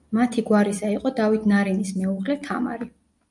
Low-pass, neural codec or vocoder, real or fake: 10.8 kHz; none; real